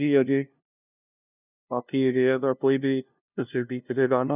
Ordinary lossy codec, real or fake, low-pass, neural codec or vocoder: none; fake; 3.6 kHz; codec, 16 kHz, 0.5 kbps, FunCodec, trained on LibriTTS, 25 frames a second